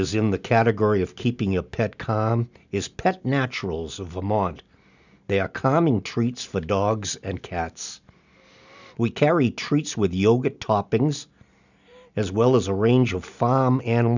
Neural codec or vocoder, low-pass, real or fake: none; 7.2 kHz; real